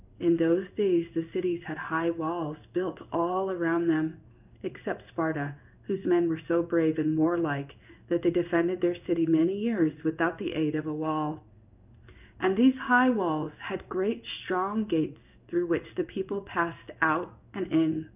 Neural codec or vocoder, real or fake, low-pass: codec, 16 kHz in and 24 kHz out, 1 kbps, XY-Tokenizer; fake; 3.6 kHz